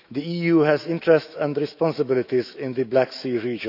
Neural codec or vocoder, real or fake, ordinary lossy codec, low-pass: autoencoder, 48 kHz, 128 numbers a frame, DAC-VAE, trained on Japanese speech; fake; none; 5.4 kHz